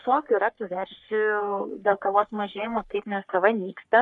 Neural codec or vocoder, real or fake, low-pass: codec, 44.1 kHz, 3.4 kbps, Pupu-Codec; fake; 10.8 kHz